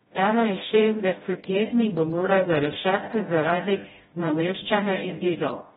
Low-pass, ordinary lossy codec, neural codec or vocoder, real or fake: 7.2 kHz; AAC, 16 kbps; codec, 16 kHz, 0.5 kbps, FreqCodec, smaller model; fake